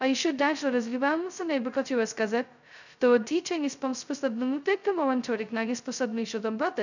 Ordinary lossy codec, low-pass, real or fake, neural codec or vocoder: none; 7.2 kHz; fake; codec, 16 kHz, 0.2 kbps, FocalCodec